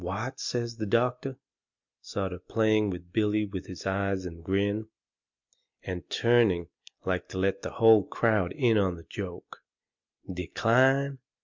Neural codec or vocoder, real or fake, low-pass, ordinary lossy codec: none; real; 7.2 kHz; MP3, 64 kbps